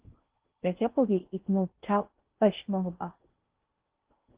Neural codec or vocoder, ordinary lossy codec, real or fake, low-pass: codec, 16 kHz in and 24 kHz out, 0.6 kbps, FocalCodec, streaming, 4096 codes; Opus, 16 kbps; fake; 3.6 kHz